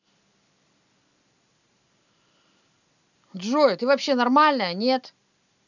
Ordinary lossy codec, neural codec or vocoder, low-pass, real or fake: none; none; 7.2 kHz; real